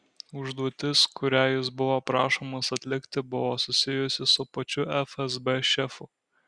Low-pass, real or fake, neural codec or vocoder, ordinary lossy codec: 9.9 kHz; real; none; Opus, 64 kbps